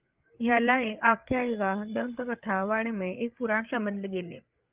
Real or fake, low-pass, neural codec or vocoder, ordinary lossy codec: fake; 3.6 kHz; codec, 16 kHz, 8 kbps, FreqCodec, larger model; Opus, 24 kbps